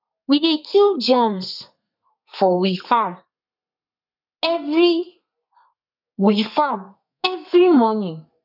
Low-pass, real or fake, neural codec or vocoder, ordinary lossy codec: 5.4 kHz; fake; codec, 32 kHz, 1.9 kbps, SNAC; none